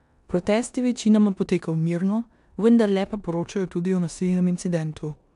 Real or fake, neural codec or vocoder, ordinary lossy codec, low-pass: fake; codec, 16 kHz in and 24 kHz out, 0.9 kbps, LongCat-Audio-Codec, four codebook decoder; AAC, 96 kbps; 10.8 kHz